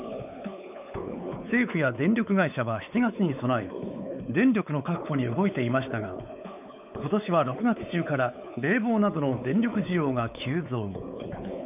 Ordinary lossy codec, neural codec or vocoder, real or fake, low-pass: none; codec, 16 kHz, 4 kbps, X-Codec, WavLM features, trained on Multilingual LibriSpeech; fake; 3.6 kHz